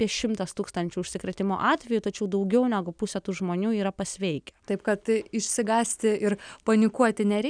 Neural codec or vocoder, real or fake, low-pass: none; real; 9.9 kHz